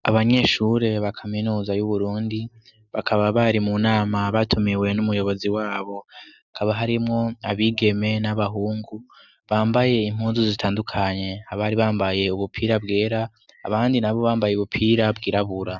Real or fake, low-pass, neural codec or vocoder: real; 7.2 kHz; none